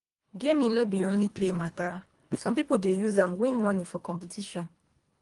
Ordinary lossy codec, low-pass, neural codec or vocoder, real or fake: Opus, 32 kbps; 10.8 kHz; codec, 24 kHz, 1.5 kbps, HILCodec; fake